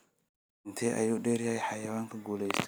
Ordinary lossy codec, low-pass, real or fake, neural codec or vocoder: none; none; real; none